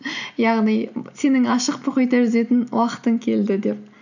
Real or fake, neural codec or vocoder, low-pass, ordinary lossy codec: real; none; 7.2 kHz; none